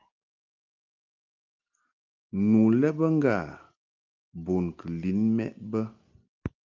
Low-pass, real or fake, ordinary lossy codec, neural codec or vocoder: 7.2 kHz; real; Opus, 24 kbps; none